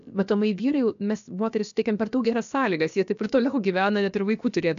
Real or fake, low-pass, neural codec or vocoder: fake; 7.2 kHz; codec, 16 kHz, about 1 kbps, DyCAST, with the encoder's durations